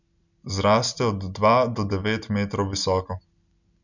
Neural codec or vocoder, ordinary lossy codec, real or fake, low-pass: none; none; real; 7.2 kHz